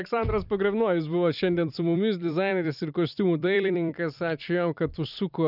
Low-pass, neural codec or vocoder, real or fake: 5.4 kHz; vocoder, 44.1 kHz, 128 mel bands every 256 samples, BigVGAN v2; fake